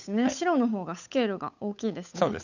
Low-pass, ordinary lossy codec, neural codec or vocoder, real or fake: 7.2 kHz; none; codec, 16 kHz, 8 kbps, FunCodec, trained on Chinese and English, 25 frames a second; fake